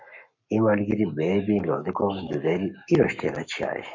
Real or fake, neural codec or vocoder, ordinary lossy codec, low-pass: fake; codec, 44.1 kHz, 7.8 kbps, Pupu-Codec; MP3, 48 kbps; 7.2 kHz